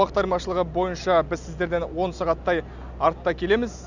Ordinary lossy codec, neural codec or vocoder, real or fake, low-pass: none; none; real; 7.2 kHz